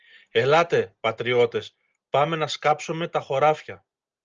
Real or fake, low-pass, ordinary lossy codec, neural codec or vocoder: real; 7.2 kHz; Opus, 24 kbps; none